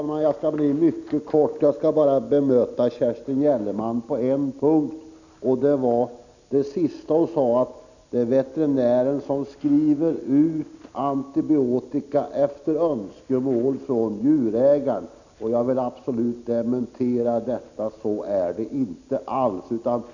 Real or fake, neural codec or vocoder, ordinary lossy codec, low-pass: real; none; none; 7.2 kHz